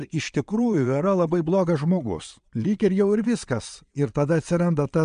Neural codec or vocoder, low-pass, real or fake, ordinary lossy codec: vocoder, 22.05 kHz, 80 mel bands, Vocos; 9.9 kHz; fake; MP3, 64 kbps